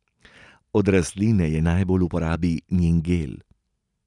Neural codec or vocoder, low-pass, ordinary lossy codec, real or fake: none; 10.8 kHz; none; real